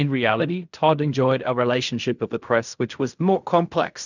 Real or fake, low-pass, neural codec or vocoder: fake; 7.2 kHz; codec, 16 kHz in and 24 kHz out, 0.4 kbps, LongCat-Audio-Codec, fine tuned four codebook decoder